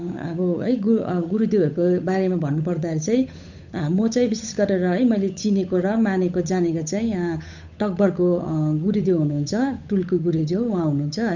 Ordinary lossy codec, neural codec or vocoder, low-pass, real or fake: none; codec, 16 kHz, 8 kbps, FunCodec, trained on Chinese and English, 25 frames a second; 7.2 kHz; fake